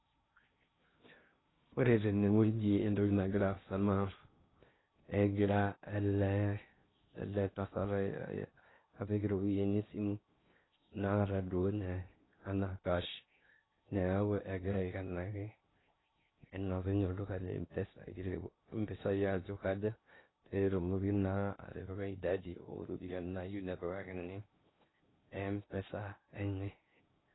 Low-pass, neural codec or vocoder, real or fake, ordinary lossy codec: 7.2 kHz; codec, 16 kHz in and 24 kHz out, 0.6 kbps, FocalCodec, streaming, 2048 codes; fake; AAC, 16 kbps